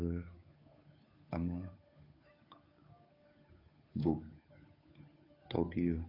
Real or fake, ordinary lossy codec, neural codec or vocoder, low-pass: fake; AAC, 24 kbps; codec, 16 kHz, 8 kbps, FunCodec, trained on Chinese and English, 25 frames a second; 5.4 kHz